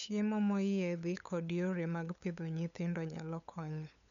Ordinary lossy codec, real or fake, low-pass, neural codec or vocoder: none; fake; 7.2 kHz; codec, 16 kHz, 8 kbps, FunCodec, trained on LibriTTS, 25 frames a second